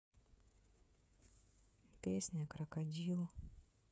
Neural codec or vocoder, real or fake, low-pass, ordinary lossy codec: codec, 16 kHz, 16 kbps, FreqCodec, smaller model; fake; none; none